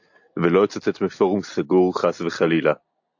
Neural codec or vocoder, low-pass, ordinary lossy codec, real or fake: none; 7.2 kHz; AAC, 48 kbps; real